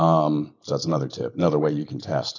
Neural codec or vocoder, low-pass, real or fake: none; 7.2 kHz; real